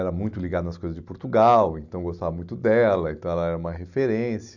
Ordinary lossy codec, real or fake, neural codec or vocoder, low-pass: none; real; none; 7.2 kHz